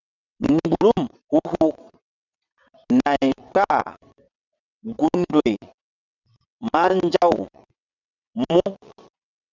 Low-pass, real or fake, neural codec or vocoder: 7.2 kHz; fake; vocoder, 22.05 kHz, 80 mel bands, WaveNeXt